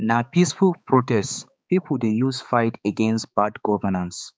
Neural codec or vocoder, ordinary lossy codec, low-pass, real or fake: codec, 16 kHz, 4 kbps, X-Codec, HuBERT features, trained on balanced general audio; none; none; fake